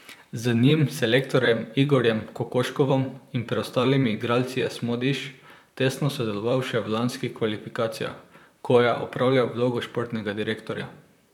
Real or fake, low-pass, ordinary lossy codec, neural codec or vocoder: fake; 19.8 kHz; none; vocoder, 44.1 kHz, 128 mel bands, Pupu-Vocoder